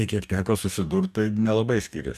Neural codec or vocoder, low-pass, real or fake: codec, 44.1 kHz, 2.6 kbps, DAC; 14.4 kHz; fake